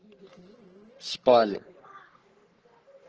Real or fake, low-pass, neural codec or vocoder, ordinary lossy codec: fake; 7.2 kHz; codec, 44.1 kHz, 3.4 kbps, Pupu-Codec; Opus, 16 kbps